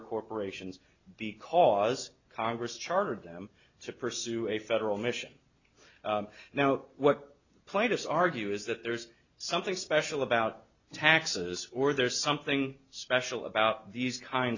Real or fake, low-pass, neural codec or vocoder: real; 7.2 kHz; none